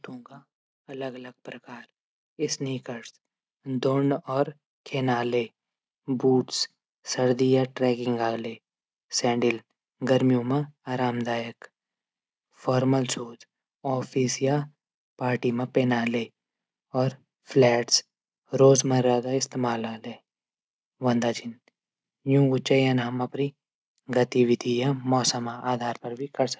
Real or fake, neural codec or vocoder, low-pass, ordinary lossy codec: real; none; none; none